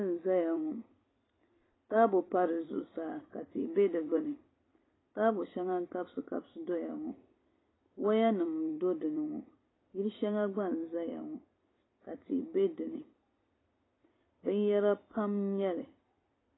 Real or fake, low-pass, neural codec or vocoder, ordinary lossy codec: fake; 7.2 kHz; vocoder, 44.1 kHz, 128 mel bands every 256 samples, BigVGAN v2; AAC, 16 kbps